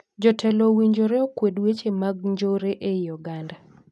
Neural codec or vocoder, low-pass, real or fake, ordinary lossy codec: none; none; real; none